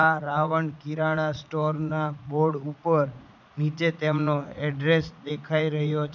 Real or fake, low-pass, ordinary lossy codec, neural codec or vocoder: fake; 7.2 kHz; none; vocoder, 44.1 kHz, 80 mel bands, Vocos